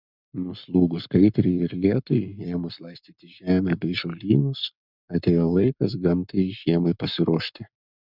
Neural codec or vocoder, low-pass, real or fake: codec, 44.1 kHz, 7.8 kbps, Pupu-Codec; 5.4 kHz; fake